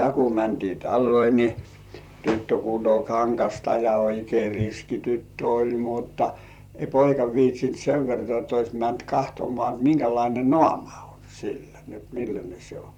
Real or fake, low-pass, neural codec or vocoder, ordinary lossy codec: fake; 19.8 kHz; vocoder, 44.1 kHz, 128 mel bands, Pupu-Vocoder; none